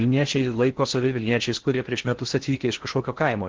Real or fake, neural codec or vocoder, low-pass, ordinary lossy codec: fake; codec, 16 kHz in and 24 kHz out, 0.6 kbps, FocalCodec, streaming, 4096 codes; 7.2 kHz; Opus, 16 kbps